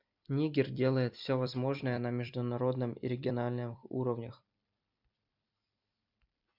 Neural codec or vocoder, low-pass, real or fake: vocoder, 44.1 kHz, 128 mel bands every 256 samples, BigVGAN v2; 5.4 kHz; fake